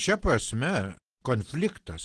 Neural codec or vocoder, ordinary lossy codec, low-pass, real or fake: none; Opus, 16 kbps; 10.8 kHz; real